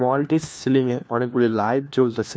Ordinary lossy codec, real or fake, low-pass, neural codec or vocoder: none; fake; none; codec, 16 kHz, 1 kbps, FunCodec, trained on LibriTTS, 50 frames a second